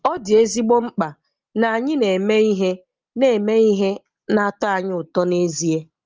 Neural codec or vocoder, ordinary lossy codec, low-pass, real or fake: none; Opus, 24 kbps; 7.2 kHz; real